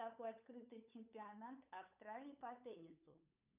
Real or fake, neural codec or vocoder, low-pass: fake; codec, 16 kHz, 8 kbps, FunCodec, trained on LibriTTS, 25 frames a second; 3.6 kHz